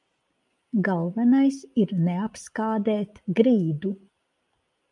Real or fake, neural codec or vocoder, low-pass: real; none; 10.8 kHz